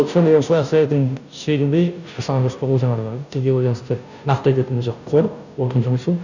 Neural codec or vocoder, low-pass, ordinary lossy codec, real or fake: codec, 16 kHz, 0.5 kbps, FunCodec, trained on Chinese and English, 25 frames a second; 7.2 kHz; none; fake